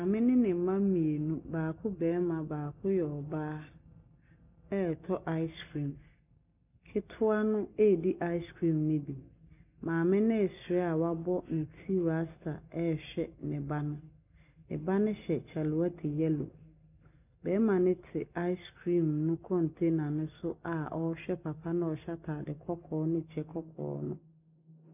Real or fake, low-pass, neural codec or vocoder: real; 3.6 kHz; none